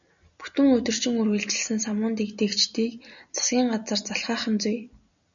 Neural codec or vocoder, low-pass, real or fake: none; 7.2 kHz; real